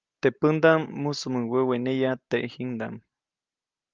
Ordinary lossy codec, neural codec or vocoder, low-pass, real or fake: Opus, 24 kbps; none; 7.2 kHz; real